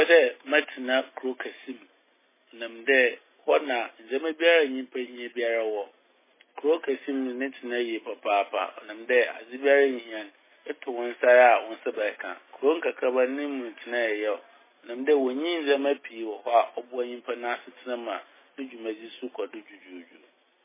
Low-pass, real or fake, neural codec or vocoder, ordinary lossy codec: 3.6 kHz; real; none; MP3, 16 kbps